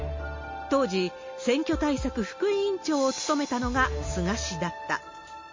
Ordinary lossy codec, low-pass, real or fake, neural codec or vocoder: MP3, 32 kbps; 7.2 kHz; real; none